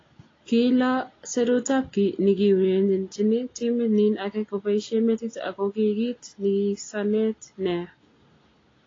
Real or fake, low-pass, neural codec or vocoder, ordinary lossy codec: real; 7.2 kHz; none; AAC, 32 kbps